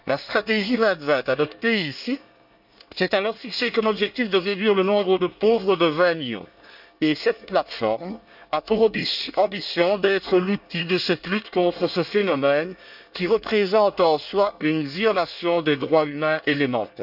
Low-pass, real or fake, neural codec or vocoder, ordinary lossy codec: 5.4 kHz; fake; codec, 24 kHz, 1 kbps, SNAC; none